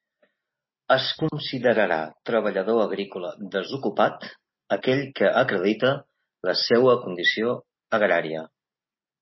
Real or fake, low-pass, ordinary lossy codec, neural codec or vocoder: real; 7.2 kHz; MP3, 24 kbps; none